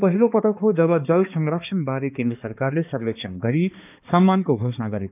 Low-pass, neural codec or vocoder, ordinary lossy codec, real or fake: 3.6 kHz; codec, 16 kHz, 2 kbps, X-Codec, HuBERT features, trained on balanced general audio; none; fake